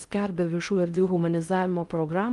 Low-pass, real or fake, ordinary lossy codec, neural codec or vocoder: 10.8 kHz; fake; Opus, 32 kbps; codec, 16 kHz in and 24 kHz out, 0.6 kbps, FocalCodec, streaming, 2048 codes